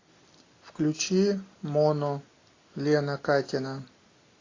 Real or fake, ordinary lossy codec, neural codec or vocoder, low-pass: real; AAC, 32 kbps; none; 7.2 kHz